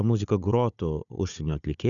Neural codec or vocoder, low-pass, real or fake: codec, 16 kHz, 4 kbps, FunCodec, trained on Chinese and English, 50 frames a second; 7.2 kHz; fake